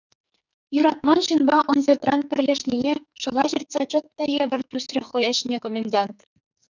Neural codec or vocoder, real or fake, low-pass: codec, 44.1 kHz, 2.6 kbps, SNAC; fake; 7.2 kHz